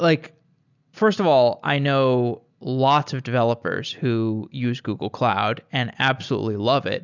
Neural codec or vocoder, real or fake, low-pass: none; real; 7.2 kHz